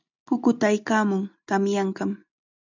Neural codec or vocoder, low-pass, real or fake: none; 7.2 kHz; real